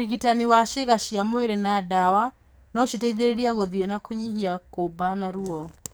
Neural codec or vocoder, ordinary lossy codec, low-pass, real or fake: codec, 44.1 kHz, 2.6 kbps, SNAC; none; none; fake